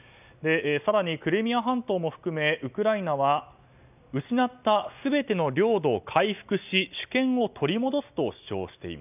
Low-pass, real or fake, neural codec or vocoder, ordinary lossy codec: 3.6 kHz; real; none; none